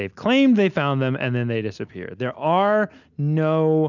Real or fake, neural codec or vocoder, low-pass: real; none; 7.2 kHz